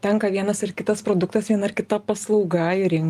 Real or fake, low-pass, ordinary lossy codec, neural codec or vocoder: real; 14.4 kHz; Opus, 32 kbps; none